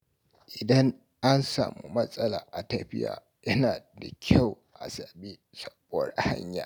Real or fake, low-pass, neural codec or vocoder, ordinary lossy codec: real; none; none; none